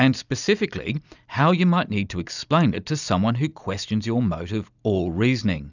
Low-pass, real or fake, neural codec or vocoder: 7.2 kHz; real; none